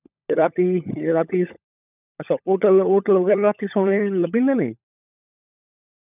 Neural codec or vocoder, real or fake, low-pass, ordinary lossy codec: codec, 16 kHz, 16 kbps, FunCodec, trained on LibriTTS, 50 frames a second; fake; 3.6 kHz; none